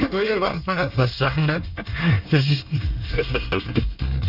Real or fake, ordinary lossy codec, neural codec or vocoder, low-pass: fake; none; codec, 24 kHz, 1 kbps, SNAC; 5.4 kHz